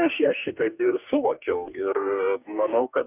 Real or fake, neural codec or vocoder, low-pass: fake; codec, 44.1 kHz, 2.6 kbps, DAC; 3.6 kHz